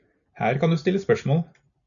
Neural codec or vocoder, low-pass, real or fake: none; 7.2 kHz; real